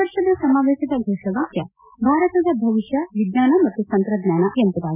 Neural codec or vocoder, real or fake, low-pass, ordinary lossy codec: none; real; 3.6 kHz; none